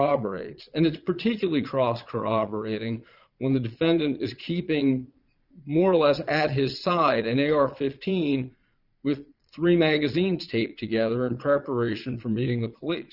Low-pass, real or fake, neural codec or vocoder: 5.4 kHz; fake; vocoder, 22.05 kHz, 80 mel bands, Vocos